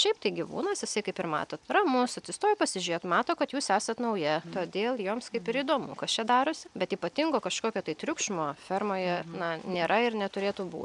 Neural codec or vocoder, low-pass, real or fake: none; 10.8 kHz; real